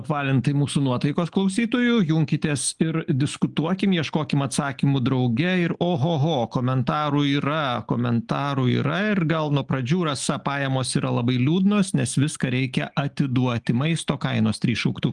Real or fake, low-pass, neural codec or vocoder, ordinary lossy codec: real; 10.8 kHz; none; Opus, 32 kbps